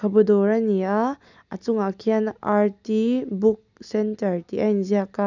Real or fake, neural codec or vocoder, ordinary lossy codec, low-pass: real; none; none; 7.2 kHz